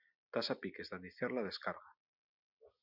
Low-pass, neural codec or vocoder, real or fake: 5.4 kHz; none; real